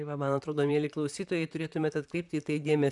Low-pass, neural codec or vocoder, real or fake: 10.8 kHz; vocoder, 44.1 kHz, 128 mel bands, Pupu-Vocoder; fake